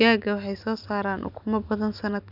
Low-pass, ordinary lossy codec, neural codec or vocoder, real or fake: 5.4 kHz; none; none; real